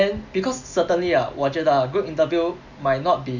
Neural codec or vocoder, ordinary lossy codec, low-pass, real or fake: none; none; 7.2 kHz; real